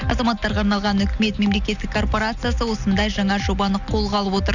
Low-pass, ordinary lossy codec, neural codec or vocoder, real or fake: 7.2 kHz; none; none; real